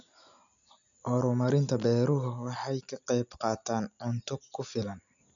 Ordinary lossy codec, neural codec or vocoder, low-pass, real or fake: none; none; 7.2 kHz; real